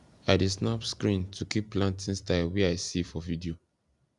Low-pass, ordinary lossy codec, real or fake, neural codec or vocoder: 10.8 kHz; none; fake; vocoder, 44.1 kHz, 128 mel bands every 256 samples, BigVGAN v2